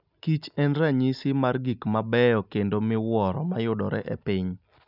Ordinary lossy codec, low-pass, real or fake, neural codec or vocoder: none; 5.4 kHz; real; none